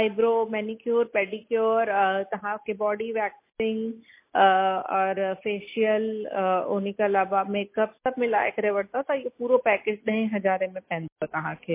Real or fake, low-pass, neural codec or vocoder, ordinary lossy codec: real; 3.6 kHz; none; MP3, 24 kbps